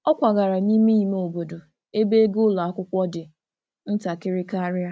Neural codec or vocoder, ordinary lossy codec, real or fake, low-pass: none; none; real; none